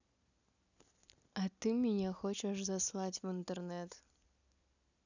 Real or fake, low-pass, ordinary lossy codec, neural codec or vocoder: real; 7.2 kHz; none; none